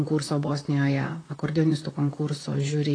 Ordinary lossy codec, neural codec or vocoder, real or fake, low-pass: AAC, 32 kbps; vocoder, 44.1 kHz, 128 mel bands, Pupu-Vocoder; fake; 9.9 kHz